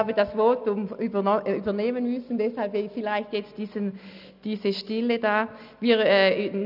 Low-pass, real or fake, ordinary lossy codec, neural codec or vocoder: 5.4 kHz; real; none; none